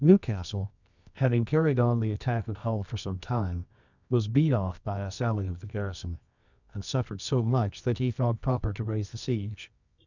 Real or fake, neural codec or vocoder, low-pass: fake; codec, 24 kHz, 0.9 kbps, WavTokenizer, medium music audio release; 7.2 kHz